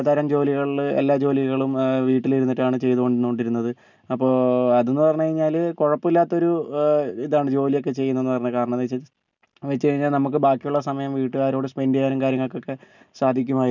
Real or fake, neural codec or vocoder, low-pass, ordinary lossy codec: real; none; 7.2 kHz; none